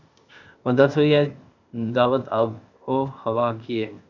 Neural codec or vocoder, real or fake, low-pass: codec, 16 kHz, 0.8 kbps, ZipCodec; fake; 7.2 kHz